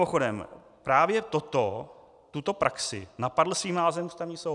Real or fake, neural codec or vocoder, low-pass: real; none; 10.8 kHz